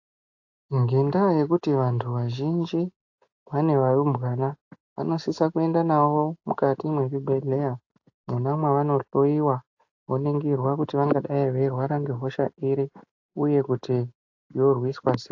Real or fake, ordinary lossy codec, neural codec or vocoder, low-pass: real; AAC, 48 kbps; none; 7.2 kHz